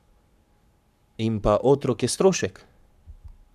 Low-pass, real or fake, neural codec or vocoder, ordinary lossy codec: 14.4 kHz; fake; codec, 44.1 kHz, 7.8 kbps, Pupu-Codec; none